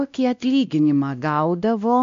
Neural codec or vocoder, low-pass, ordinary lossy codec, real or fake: codec, 16 kHz, 1 kbps, X-Codec, WavLM features, trained on Multilingual LibriSpeech; 7.2 kHz; AAC, 64 kbps; fake